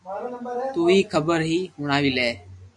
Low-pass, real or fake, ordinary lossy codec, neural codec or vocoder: 10.8 kHz; real; MP3, 96 kbps; none